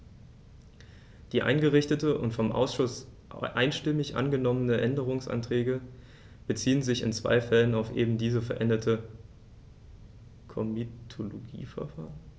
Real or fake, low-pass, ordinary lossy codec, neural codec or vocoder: real; none; none; none